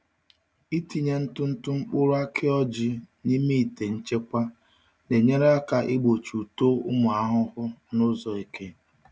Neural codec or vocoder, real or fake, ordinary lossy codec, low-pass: none; real; none; none